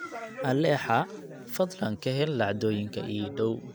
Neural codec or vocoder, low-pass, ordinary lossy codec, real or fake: none; none; none; real